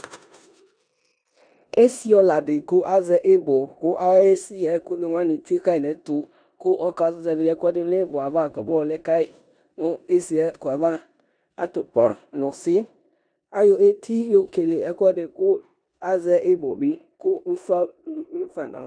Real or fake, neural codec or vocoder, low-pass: fake; codec, 16 kHz in and 24 kHz out, 0.9 kbps, LongCat-Audio-Codec, four codebook decoder; 9.9 kHz